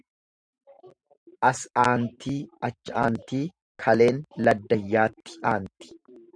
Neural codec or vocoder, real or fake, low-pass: vocoder, 44.1 kHz, 128 mel bands every 512 samples, BigVGAN v2; fake; 9.9 kHz